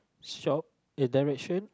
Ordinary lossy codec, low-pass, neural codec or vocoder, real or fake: none; none; none; real